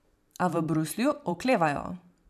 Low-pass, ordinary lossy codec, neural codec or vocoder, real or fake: 14.4 kHz; none; vocoder, 44.1 kHz, 128 mel bands every 512 samples, BigVGAN v2; fake